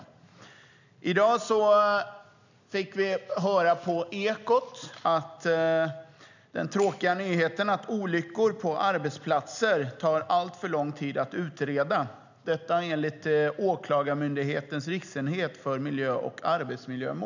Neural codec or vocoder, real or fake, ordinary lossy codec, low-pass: none; real; none; 7.2 kHz